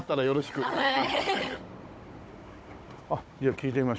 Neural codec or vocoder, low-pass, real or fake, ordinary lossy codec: codec, 16 kHz, 16 kbps, FunCodec, trained on Chinese and English, 50 frames a second; none; fake; none